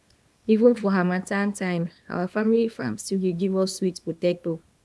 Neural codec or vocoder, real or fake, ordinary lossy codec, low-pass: codec, 24 kHz, 0.9 kbps, WavTokenizer, small release; fake; none; none